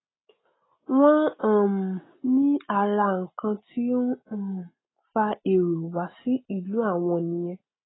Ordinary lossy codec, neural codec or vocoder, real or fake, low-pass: AAC, 16 kbps; none; real; 7.2 kHz